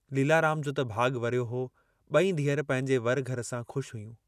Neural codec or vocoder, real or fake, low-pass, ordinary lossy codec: none; real; 14.4 kHz; none